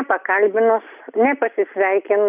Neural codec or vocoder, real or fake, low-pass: none; real; 3.6 kHz